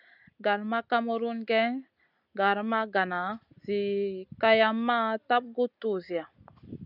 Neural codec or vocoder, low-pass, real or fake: none; 5.4 kHz; real